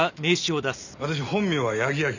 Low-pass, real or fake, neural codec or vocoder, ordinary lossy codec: 7.2 kHz; real; none; none